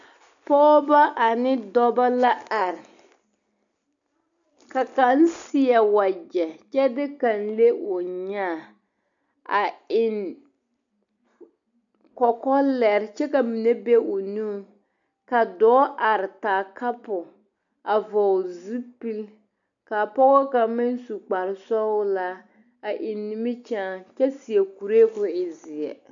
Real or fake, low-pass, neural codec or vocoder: real; 7.2 kHz; none